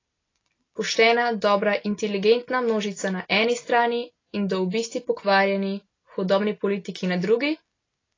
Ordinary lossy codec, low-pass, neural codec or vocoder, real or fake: AAC, 32 kbps; 7.2 kHz; none; real